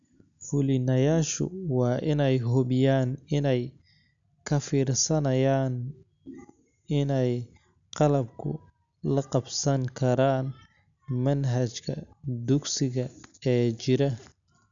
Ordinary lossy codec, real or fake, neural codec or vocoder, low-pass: none; real; none; 7.2 kHz